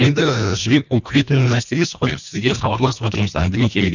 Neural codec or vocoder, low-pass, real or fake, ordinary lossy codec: codec, 24 kHz, 1.5 kbps, HILCodec; 7.2 kHz; fake; none